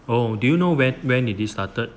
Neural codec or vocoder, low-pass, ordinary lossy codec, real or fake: none; none; none; real